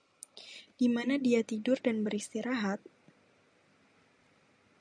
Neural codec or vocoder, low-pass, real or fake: none; 9.9 kHz; real